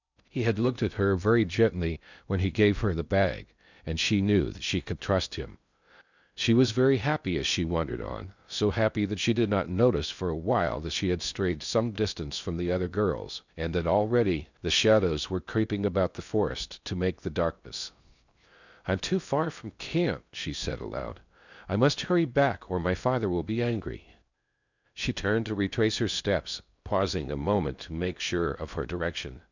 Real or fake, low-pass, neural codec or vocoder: fake; 7.2 kHz; codec, 16 kHz in and 24 kHz out, 0.6 kbps, FocalCodec, streaming, 2048 codes